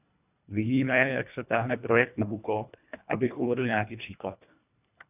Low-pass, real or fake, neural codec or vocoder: 3.6 kHz; fake; codec, 24 kHz, 1.5 kbps, HILCodec